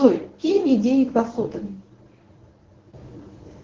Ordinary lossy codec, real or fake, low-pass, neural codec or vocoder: Opus, 16 kbps; fake; 7.2 kHz; codec, 24 kHz, 0.9 kbps, WavTokenizer, medium speech release version 1